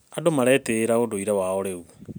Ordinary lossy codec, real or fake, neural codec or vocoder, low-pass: none; real; none; none